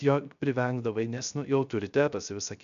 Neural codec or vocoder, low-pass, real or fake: codec, 16 kHz, 0.3 kbps, FocalCodec; 7.2 kHz; fake